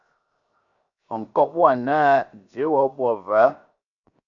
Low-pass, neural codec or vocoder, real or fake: 7.2 kHz; codec, 16 kHz, 0.7 kbps, FocalCodec; fake